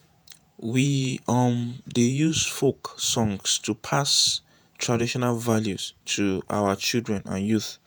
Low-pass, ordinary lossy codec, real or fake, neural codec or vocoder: none; none; fake; vocoder, 48 kHz, 128 mel bands, Vocos